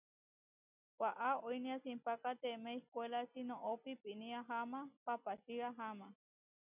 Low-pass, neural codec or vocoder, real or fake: 3.6 kHz; none; real